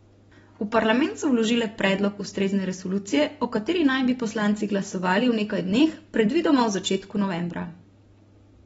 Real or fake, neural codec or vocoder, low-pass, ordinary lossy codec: real; none; 10.8 kHz; AAC, 24 kbps